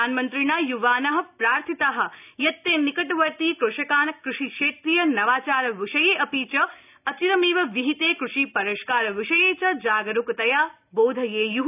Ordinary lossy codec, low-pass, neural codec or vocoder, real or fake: none; 3.6 kHz; none; real